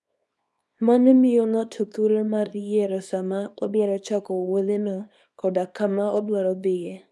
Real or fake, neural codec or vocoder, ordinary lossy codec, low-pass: fake; codec, 24 kHz, 0.9 kbps, WavTokenizer, small release; none; none